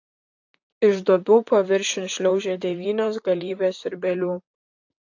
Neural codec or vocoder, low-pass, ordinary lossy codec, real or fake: vocoder, 44.1 kHz, 128 mel bands, Pupu-Vocoder; 7.2 kHz; AAC, 48 kbps; fake